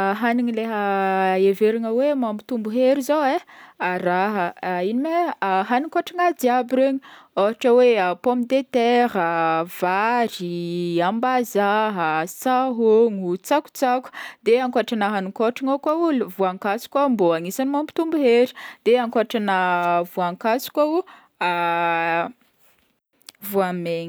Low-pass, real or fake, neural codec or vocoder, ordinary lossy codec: none; real; none; none